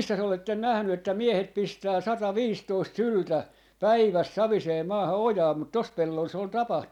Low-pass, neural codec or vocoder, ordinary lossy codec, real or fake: 19.8 kHz; none; none; real